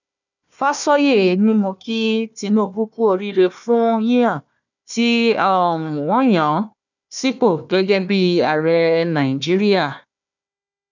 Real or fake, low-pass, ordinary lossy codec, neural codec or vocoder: fake; 7.2 kHz; none; codec, 16 kHz, 1 kbps, FunCodec, trained on Chinese and English, 50 frames a second